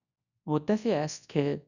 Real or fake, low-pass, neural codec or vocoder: fake; 7.2 kHz; codec, 24 kHz, 0.9 kbps, WavTokenizer, large speech release